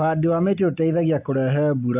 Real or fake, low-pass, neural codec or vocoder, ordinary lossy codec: real; 3.6 kHz; none; AAC, 32 kbps